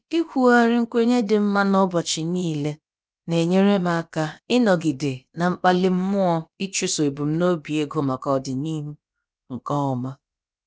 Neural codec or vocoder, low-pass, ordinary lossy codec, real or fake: codec, 16 kHz, about 1 kbps, DyCAST, with the encoder's durations; none; none; fake